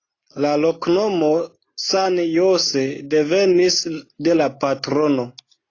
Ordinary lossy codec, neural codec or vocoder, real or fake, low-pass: AAC, 32 kbps; none; real; 7.2 kHz